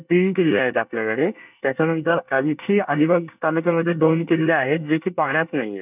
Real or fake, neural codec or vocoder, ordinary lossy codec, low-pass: fake; codec, 24 kHz, 1 kbps, SNAC; none; 3.6 kHz